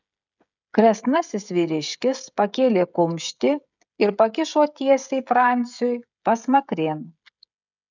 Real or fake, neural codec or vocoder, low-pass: fake; codec, 16 kHz, 16 kbps, FreqCodec, smaller model; 7.2 kHz